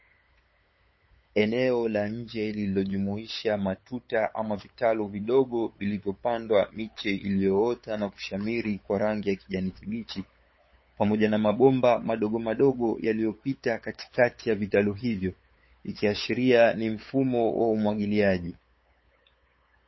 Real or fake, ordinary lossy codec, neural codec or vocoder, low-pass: fake; MP3, 24 kbps; codec, 16 kHz, 8 kbps, FunCodec, trained on LibriTTS, 25 frames a second; 7.2 kHz